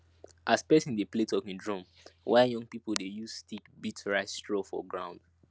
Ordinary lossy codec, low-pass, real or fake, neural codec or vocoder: none; none; real; none